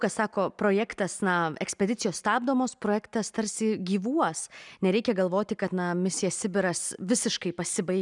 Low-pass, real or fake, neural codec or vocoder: 10.8 kHz; real; none